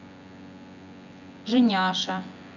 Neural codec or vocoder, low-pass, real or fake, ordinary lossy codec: vocoder, 24 kHz, 100 mel bands, Vocos; 7.2 kHz; fake; none